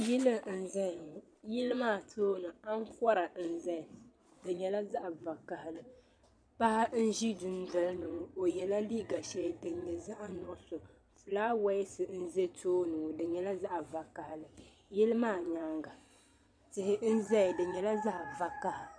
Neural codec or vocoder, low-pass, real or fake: vocoder, 22.05 kHz, 80 mel bands, Vocos; 9.9 kHz; fake